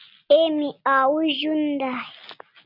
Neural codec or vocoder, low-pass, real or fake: none; 5.4 kHz; real